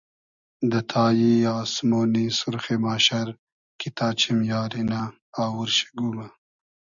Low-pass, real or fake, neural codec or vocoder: 7.2 kHz; real; none